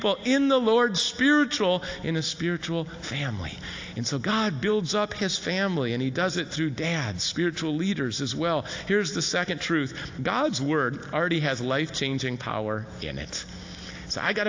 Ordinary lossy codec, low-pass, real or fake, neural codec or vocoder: AAC, 48 kbps; 7.2 kHz; real; none